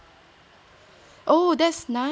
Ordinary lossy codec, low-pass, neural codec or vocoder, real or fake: none; none; none; real